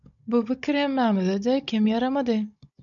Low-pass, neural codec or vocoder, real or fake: 7.2 kHz; codec, 16 kHz, 16 kbps, FunCodec, trained on Chinese and English, 50 frames a second; fake